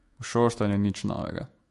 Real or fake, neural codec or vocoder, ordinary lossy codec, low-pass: fake; autoencoder, 48 kHz, 128 numbers a frame, DAC-VAE, trained on Japanese speech; MP3, 48 kbps; 14.4 kHz